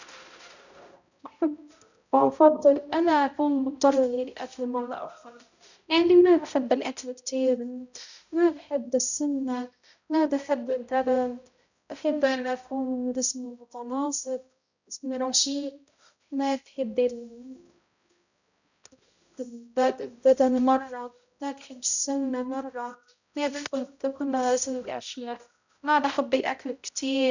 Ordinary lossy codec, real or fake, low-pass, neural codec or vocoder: none; fake; 7.2 kHz; codec, 16 kHz, 0.5 kbps, X-Codec, HuBERT features, trained on balanced general audio